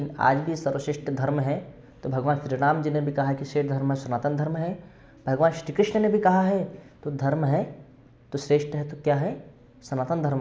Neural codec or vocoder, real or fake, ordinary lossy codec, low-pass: none; real; none; none